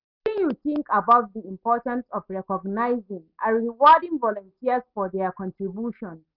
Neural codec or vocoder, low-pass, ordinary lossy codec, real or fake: none; 5.4 kHz; none; real